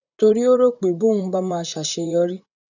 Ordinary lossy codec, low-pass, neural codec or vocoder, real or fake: none; 7.2 kHz; vocoder, 44.1 kHz, 128 mel bands, Pupu-Vocoder; fake